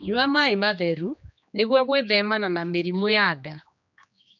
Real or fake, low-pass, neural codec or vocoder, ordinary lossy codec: fake; 7.2 kHz; codec, 16 kHz, 2 kbps, X-Codec, HuBERT features, trained on general audio; none